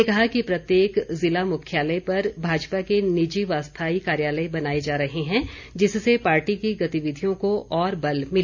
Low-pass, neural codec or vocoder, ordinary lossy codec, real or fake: 7.2 kHz; none; none; real